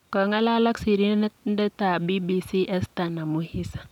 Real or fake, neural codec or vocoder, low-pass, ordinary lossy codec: real; none; 19.8 kHz; none